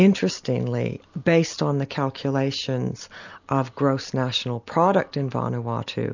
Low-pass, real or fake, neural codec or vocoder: 7.2 kHz; real; none